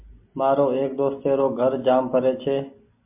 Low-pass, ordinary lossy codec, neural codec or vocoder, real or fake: 3.6 kHz; MP3, 32 kbps; none; real